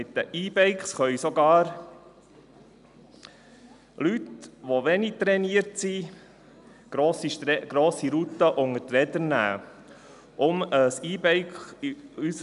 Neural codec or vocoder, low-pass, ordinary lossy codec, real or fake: none; 10.8 kHz; none; real